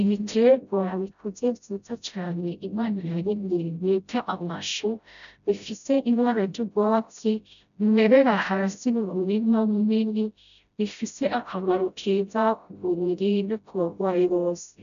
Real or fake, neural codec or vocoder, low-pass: fake; codec, 16 kHz, 0.5 kbps, FreqCodec, smaller model; 7.2 kHz